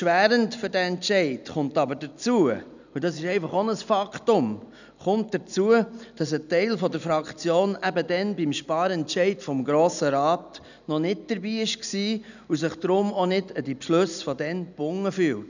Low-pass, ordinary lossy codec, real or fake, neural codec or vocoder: 7.2 kHz; none; real; none